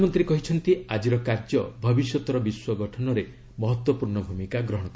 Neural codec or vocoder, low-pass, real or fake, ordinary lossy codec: none; none; real; none